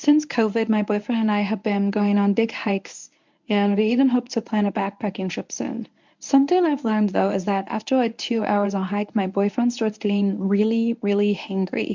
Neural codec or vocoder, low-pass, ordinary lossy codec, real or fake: codec, 24 kHz, 0.9 kbps, WavTokenizer, medium speech release version 1; 7.2 kHz; MP3, 64 kbps; fake